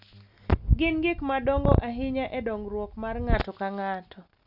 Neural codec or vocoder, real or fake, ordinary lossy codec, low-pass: none; real; none; 5.4 kHz